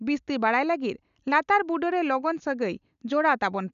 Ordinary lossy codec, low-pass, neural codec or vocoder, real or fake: none; 7.2 kHz; none; real